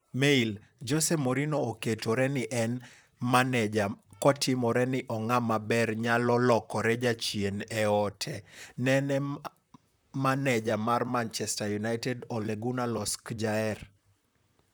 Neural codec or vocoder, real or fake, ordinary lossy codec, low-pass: vocoder, 44.1 kHz, 128 mel bands, Pupu-Vocoder; fake; none; none